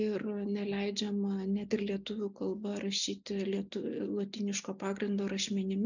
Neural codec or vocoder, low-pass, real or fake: none; 7.2 kHz; real